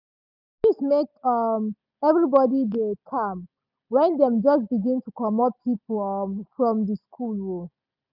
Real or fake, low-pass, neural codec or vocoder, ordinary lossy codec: real; 5.4 kHz; none; none